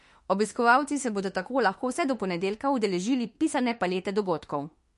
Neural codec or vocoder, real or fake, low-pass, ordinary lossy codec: autoencoder, 48 kHz, 32 numbers a frame, DAC-VAE, trained on Japanese speech; fake; 14.4 kHz; MP3, 48 kbps